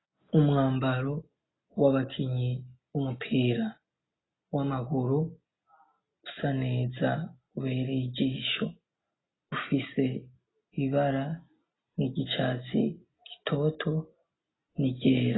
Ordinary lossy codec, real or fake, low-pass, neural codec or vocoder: AAC, 16 kbps; real; 7.2 kHz; none